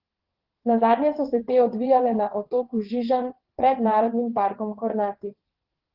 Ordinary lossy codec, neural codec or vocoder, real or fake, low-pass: Opus, 16 kbps; vocoder, 22.05 kHz, 80 mel bands, WaveNeXt; fake; 5.4 kHz